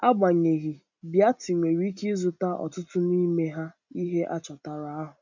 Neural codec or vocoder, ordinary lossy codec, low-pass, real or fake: none; none; 7.2 kHz; real